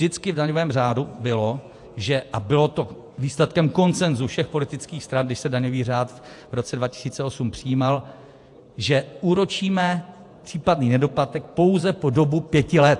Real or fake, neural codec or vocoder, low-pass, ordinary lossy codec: fake; vocoder, 48 kHz, 128 mel bands, Vocos; 10.8 kHz; AAC, 64 kbps